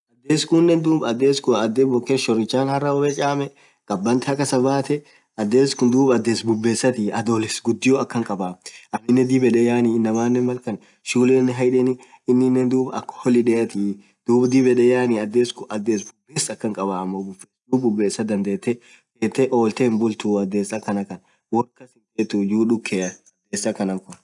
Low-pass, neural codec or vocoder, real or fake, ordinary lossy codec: 10.8 kHz; none; real; none